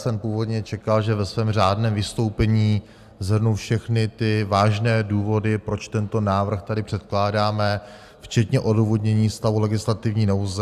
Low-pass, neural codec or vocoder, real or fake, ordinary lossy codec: 14.4 kHz; none; real; AAC, 96 kbps